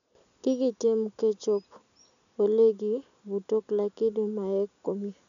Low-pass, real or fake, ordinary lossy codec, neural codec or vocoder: 7.2 kHz; real; none; none